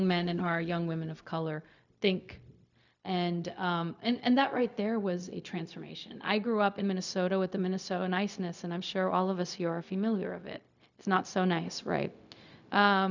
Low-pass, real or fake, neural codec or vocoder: 7.2 kHz; fake; codec, 16 kHz, 0.4 kbps, LongCat-Audio-Codec